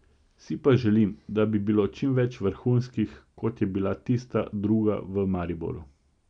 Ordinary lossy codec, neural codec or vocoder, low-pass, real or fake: none; none; 9.9 kHz; real